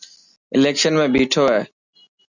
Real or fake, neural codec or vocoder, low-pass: real; none; 7.2 kHz